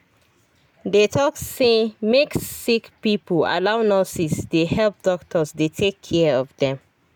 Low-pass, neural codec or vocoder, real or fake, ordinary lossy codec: none; none; real; none